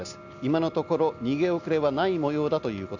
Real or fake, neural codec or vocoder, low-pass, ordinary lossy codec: real; none; 7.2 kHz; none